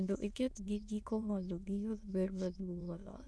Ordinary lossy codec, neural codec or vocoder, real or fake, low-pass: none; autoencoder, 22.05 kHz, a latent of 192 numbers a frame, VITS, trained on many speakers; fake; none